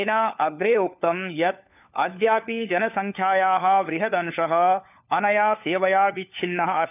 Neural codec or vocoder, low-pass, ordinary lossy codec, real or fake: codec, 16 kHz, 4 kbps, FunCodec, trained on LibriTTS, 50 frames a second; 3.6 kHz; none; fake